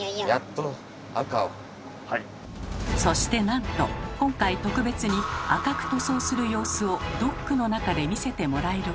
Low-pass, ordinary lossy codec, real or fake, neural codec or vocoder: none; none; real; none